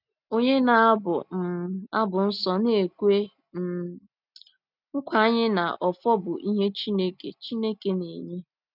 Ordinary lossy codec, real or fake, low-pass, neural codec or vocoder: none; real; 5.4 kHz; none